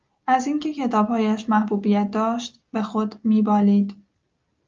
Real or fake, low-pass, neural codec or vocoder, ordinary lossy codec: real; 7.2 kHz; none; Opus, 24 kbps